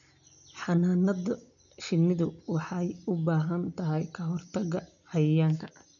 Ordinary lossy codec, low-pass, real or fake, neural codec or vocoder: none; 7.2 kHz; real; none